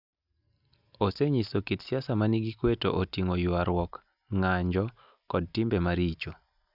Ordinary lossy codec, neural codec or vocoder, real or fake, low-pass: none; none; real; 5.4 kHz